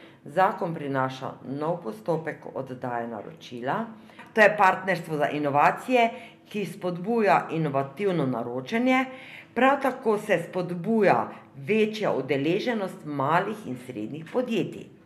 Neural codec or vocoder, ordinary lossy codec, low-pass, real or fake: none; MP3, 96 kbps; 14.4 kHz; real